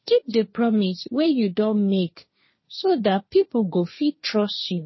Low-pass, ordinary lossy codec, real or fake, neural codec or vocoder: 7.2 kHz; MP3, 24 kbps; fake; codec, 16 kHz, 1.1 kbps, Voila-Tokenizer